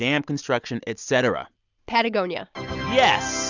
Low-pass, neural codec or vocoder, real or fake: 7.2 kHz; none; real